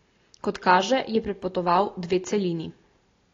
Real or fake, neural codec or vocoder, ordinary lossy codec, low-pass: real; none; AAC, 32 kbps; 7.2 kHz